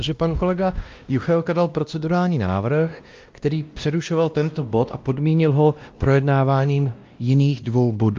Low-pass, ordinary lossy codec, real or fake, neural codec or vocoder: 7.2 kHz; Opus, 24 kbps; fake; codec, 16 kHz, 1 kbps, X-Codec, WavLM features, trained on Multilingual LibriSpeech